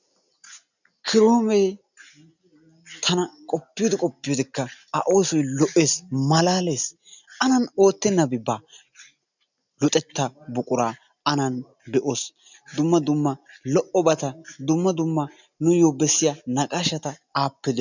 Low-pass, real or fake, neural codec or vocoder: 7.2 kHz; real; none